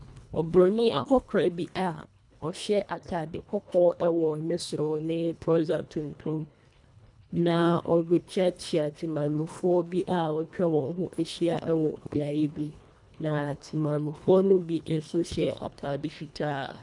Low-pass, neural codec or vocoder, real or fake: 10.8 kHz; codec, 24 kHz, 1.5 kbps, HILCodec; fake